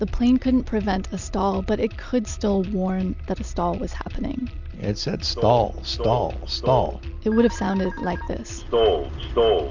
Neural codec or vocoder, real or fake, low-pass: none; real; 7.2 kHz